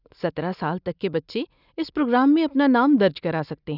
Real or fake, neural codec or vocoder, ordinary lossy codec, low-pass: real; none; none; 5.4 kHz